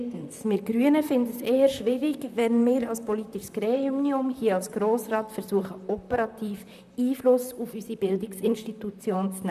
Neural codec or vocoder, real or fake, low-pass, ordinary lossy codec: vocoder, 44.1 kHz, 128 mel bands, Pupu-Vocoder; fake; 14.4 kHz; none